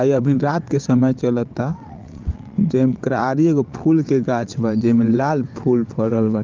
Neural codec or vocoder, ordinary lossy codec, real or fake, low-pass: vocoder, 22.05 kHz, 80 mel bands, WaveNeXt; Opus, 24 kbps; fake; 7.2 kHz